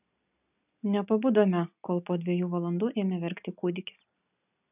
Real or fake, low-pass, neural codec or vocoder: real; 3.6 kHz; none